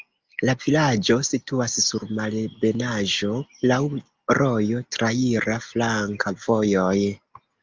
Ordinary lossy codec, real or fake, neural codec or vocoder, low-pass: Opus, 32 kbps; real; none; 7.2 kHz